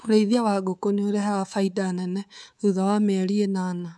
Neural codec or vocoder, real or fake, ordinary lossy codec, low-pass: codec, 24 kHz, 3.1 kbps, DualCodec; fake; none; none